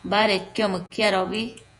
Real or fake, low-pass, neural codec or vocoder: fake; 10.8 kHz; vocoder, 48 kHz, 128 mel bands, Vocos